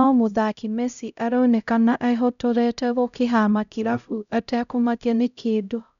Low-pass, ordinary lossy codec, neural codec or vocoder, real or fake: 7.2 kHz; none; codec, 16 kHz, 0.5 kbps, X-Codec, HuBERT features, trained on LibriSpeech; fake